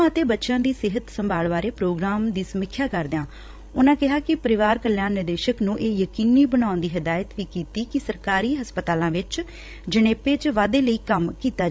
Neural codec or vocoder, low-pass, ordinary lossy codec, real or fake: codec, 16 kHz, 16 kbps, FreqCodec, larger model; none; none; fake